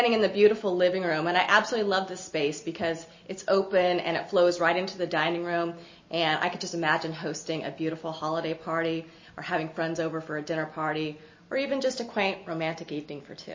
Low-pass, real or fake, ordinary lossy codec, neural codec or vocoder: 7.2 kHz; real; MP3, 32 kbps; none